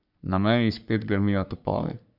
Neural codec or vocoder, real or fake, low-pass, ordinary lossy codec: codec, 44.1 kHz, 3.4 kbps, Pupu-Codec; fake; 5.4 kHz; none